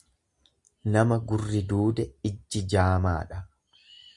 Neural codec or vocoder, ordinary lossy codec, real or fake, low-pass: none; Opus, 64 kbps; real; 10.8 kHz